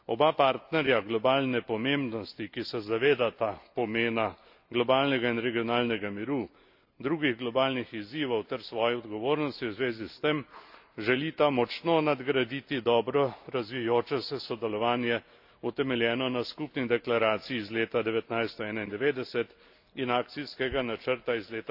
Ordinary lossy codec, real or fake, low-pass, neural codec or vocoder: AAC, 48 kbps; real; 5.4 kHz; none